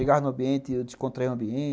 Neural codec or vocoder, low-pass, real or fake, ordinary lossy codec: none; none; real; none